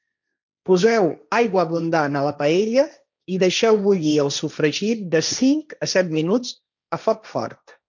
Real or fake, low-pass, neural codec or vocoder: fake; 7.2 kHz; codec, 16 kHz, 1.1 kbps, Voila-Tokenizer